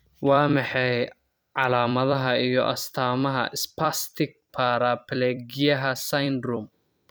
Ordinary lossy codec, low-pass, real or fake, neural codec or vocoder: none; none; fake; vocoder, 44.1 kHz, 128 mel bands every 256 samples, BigVGAN v2